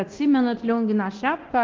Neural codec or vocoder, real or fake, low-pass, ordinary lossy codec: codec, 24 kHz, 0.9 kbps, DualCodec; fake; 7.2 kHz; Opus, 16 kbps